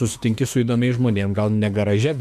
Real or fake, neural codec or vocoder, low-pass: fake; autoencoder, 48 kHz, 32 numbers a frame, DAC-VAE, trained on Japanese speech; 14.4 kHz